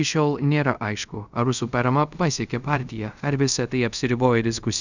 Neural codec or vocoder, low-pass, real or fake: codec, 24 kHz, 0.5 kbps, DualCodec; 7.2 kHz; fake